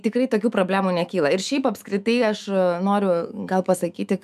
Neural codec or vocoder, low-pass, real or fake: autoencoder, 48 kHz, 128 numbers a frame, DAC-VAE, trained on Japanese speech; 14.4 kHz; fake